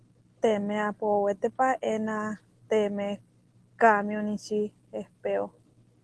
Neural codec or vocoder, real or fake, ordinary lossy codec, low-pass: none; real; Opus, 16 kbps; 10.8 kHz